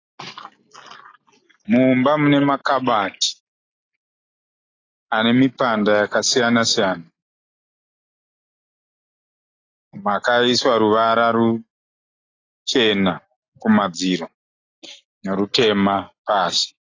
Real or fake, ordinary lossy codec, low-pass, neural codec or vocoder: real; AAC, 32 kbps; 7.2 kHz; none